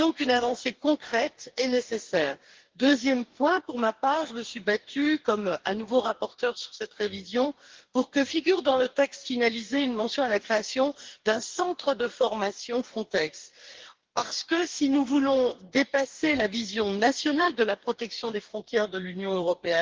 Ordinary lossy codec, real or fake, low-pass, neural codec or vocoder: Opus, 16 kbps; fake; 7.2 kHz; codec, 44.1 kHz, 2.6 kbps, DAC